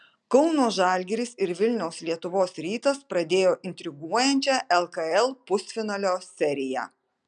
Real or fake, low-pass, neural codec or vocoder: real; 9.9 kHz; none